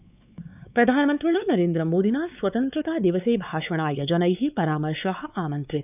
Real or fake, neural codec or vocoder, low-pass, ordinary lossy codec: fake; codec, 16 kHz, 4 kbps, X-Codec, WavLM features, trained on Multilingual LibriSpeech; 3.6 kHz; none